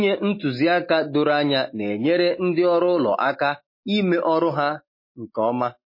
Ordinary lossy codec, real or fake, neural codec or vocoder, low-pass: MP3, 24 kbps; real; none; 5.4 kHz